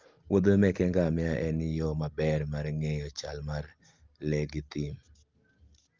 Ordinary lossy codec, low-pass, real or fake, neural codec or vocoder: Opus, 32 kbps; 7.2 kHz; real; none